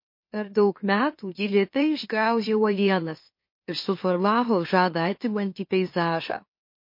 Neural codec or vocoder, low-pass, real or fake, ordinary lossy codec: autoencoder, 44.1 kHz, a latent of 192 numbers a frame, MeloTTS; 5.4 kHz; fake; MP3, 32 kbps